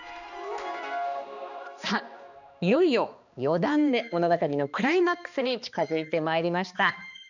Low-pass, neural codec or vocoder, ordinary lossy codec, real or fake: 7.2 kHz; codec, 16 kHz, 2 kbps, X-Codec, HuBERT features, trained on balanced general audio; none; fake